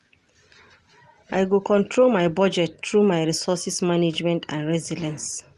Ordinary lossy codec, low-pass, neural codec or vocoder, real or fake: Opus, 24 kbps; 10.8 kHz; none; real